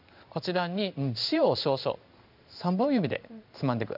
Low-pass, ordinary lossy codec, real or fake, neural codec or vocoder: 5.4 kHz; none; fake; codec, 16 kHz in and 24 kHz out, 1 kbps, XY-Tokenizer